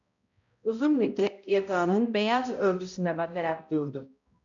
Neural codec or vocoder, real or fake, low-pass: codec, 16 kHz, 0.5 kbps, X-Codec, HuBERT features, trained on balanced general audio; fake; 7.2 kHz